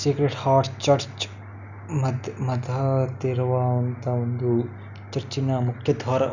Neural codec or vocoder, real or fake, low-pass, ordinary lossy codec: none; real; 7.2 kHz; none